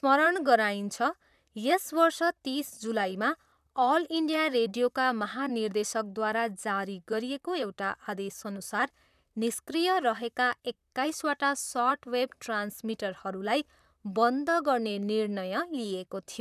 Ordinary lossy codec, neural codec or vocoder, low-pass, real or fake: none; vocoder, 44.1 kHz, 128 mel bands, Pupu-Vocoder; 14.4 kHz; fake